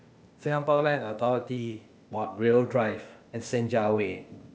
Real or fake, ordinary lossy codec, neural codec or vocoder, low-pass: fake; none; codec, 16 kHz, 0.8 kbps, ZipCodec; none